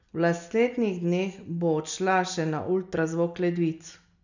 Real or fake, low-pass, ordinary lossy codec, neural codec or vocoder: real; 7.2 kHz; none; none